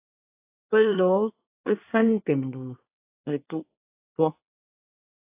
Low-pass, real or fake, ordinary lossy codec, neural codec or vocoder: 3.6 kHz; fake; AAC, 24 kbps; codec, 24 kHz, 1 kbps, SNAC